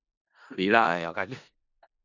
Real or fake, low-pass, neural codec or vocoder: fake; 7.2 kHz; codec, 16 kHz in and 24 kHz out, 0.4 kbps, LongCat-Audio-Codec, four codebook decoder